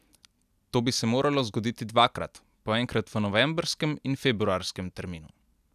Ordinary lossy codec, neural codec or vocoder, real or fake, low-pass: none; none; real; 14.4 kHz